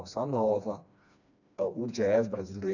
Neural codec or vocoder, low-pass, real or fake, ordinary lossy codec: codec, 16 kHz, 2 kbps, FreqCodec, smaller model; 7.2 kHz; fake; none